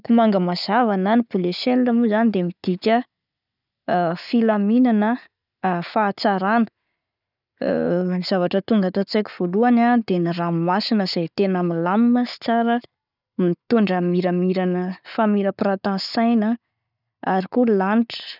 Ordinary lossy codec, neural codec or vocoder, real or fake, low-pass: none; none; real; 5.4 kHz